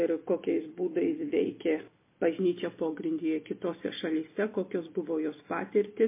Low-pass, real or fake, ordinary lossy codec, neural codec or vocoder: 3.6 kHz; real; MP3, 24 kbps; none